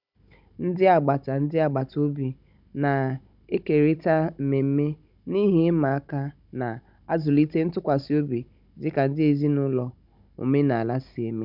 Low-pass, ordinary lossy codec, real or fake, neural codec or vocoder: 5.4 kHz; none; fake; codec, 16 kHz, 16 kbps, FunCodec, trained on Chinese and English, 50 frames a second